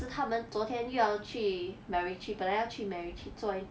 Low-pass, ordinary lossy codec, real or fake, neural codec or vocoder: none; none; real; none